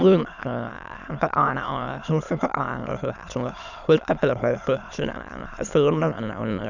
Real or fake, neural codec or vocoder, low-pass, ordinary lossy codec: fake; autoencoder, 22.05 kHz, a latent of 192 numbers a frame, VITS, trained on many speakers; 7.2 kHz; none